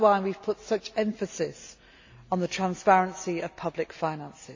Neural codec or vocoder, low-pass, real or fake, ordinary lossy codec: none; 7.2 kHz; real; AAC, 48 kbps